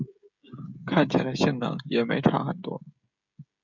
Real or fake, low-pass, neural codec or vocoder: fake; 7.2 kHz; codec, 16 kHz, 16 kbps, FreqCodec, smaller model